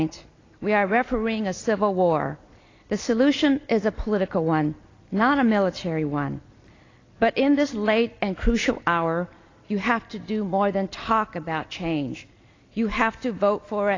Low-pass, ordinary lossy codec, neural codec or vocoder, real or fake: 7.2 kHz; AAC, 32 kbps; none; real